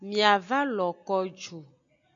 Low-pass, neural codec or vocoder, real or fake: 7.2 kHz; none; real